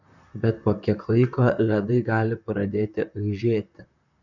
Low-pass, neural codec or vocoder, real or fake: 7.2 kHz; vocoder, 44.1 kHz, 128 mel bands, Pupu-Vocoder; fake